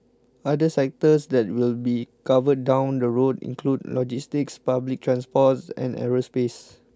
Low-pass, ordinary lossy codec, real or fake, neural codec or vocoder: none; none; real; none